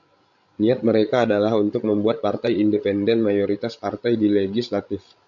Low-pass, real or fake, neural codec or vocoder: 7.2 kHz; fake; codec, 16 kHz, 16 kbps, FreqCodec, larger model